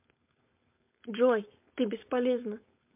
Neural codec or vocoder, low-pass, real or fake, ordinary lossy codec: codec, 16 kHz, 4.8 kbps, FACodec; 3.6 kHz; fake; MP3, 32 kbps